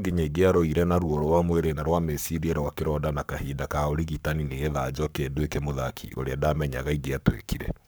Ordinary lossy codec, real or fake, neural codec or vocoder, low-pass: none; fake; codec, 44.1 kHz, 7.8 kbps, Pupu-Codec; none